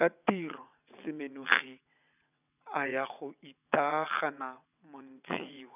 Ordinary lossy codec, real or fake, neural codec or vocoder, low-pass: none; fake; vocoder, 22.05 kHz, 80 mel bands, WaveNeXt; 3.6 kHz